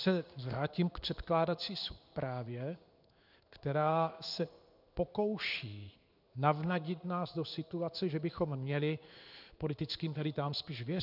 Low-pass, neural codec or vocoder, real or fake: 5.4 kHz; codec, 16 kHz in and 24 kHz out, 1 kbps, XY-Tokenizer; fake